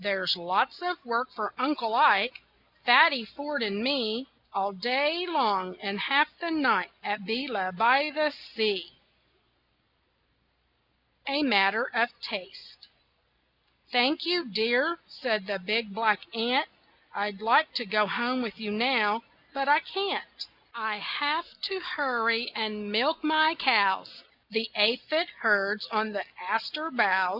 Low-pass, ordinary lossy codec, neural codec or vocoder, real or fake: 5.4 kHz; Opus, 64 kbps; none; real